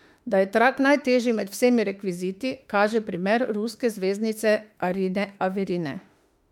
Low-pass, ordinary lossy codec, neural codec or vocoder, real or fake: 19.8 kHz; MP3, 96 kbps; autoencoder, 48 kHz, 32 numbers a frame, DAC-VAE, trained on Japanese speech; fake